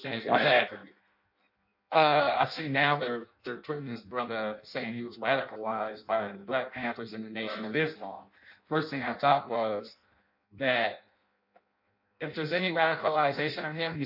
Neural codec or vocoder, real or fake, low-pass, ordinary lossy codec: codec, 16 kHz in and 24 kHz out, 0.6 kbps, FireRedTTS-2 codec; fake; 5.4 kHz; MP3, 48 kbps